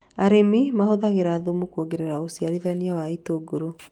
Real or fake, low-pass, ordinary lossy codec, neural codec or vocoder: fake; 19.8 kHz; Opus, 24 kbps; autoencoder, 48 kHz, 128 numbers a frame, DAC-VAE, trained on Japanese speech